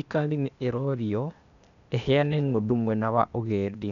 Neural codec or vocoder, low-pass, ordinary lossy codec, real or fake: codec, 16 kHz, 0.8 kbps, ZipCodec; 7.2 kHz; none; fake